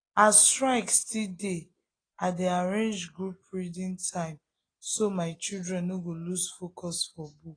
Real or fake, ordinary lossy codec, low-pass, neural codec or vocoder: real; AAC, 32 kbps; 9.9 kHz; none